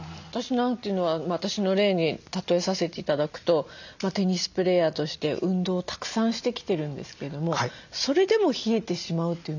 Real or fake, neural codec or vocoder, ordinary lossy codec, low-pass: real; none; none; 7.2 kHz